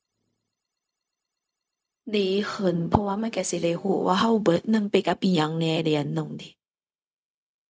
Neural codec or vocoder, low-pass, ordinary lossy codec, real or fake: codec, 16 kHz, 0.4 kbps, LongCat-Audio-Codec; none; none; fake